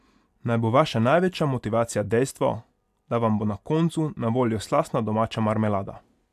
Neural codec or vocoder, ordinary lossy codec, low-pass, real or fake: none; AAC, 96 kbps; 14.4 kHz; real